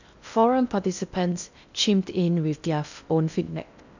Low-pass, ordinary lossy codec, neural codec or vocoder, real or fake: 7.2 kHz; none; codec, 16 kHz in and 24 kHz out, 0.6 kbps, FocalCodec, streaming, 2048 codes; fake